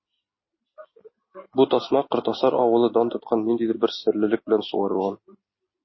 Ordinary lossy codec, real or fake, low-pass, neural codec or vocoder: MP3, 24 kbps; real; 7.2 kHz; none